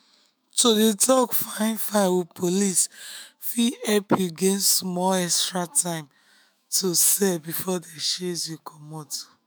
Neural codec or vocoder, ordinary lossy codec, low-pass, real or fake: autoencoder, 48 kHz, 128 numbers a frame, DAC-VAE, trained on Japanese speech; none; none; fake